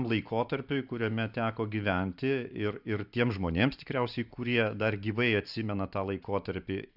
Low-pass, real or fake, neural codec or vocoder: 5.4 kHz; fake; vocoder, 44.1 kHz, 128 mel bands every 512 samples, BigVGAN v2